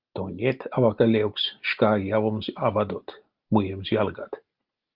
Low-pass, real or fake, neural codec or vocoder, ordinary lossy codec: 5.4 kHz; real; none; Opus, 32 kbps